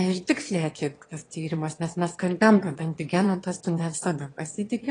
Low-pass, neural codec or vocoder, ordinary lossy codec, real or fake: 9.9 kHz; autoencoder, 22.05 kHz, a latent of 192 numbers a frame, VITS, trained on one speaker; AAC, 32 kbps; fake